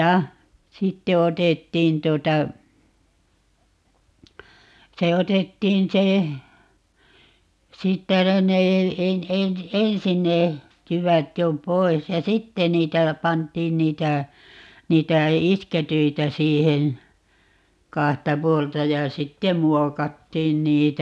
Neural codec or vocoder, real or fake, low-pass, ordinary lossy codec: none; real; none; none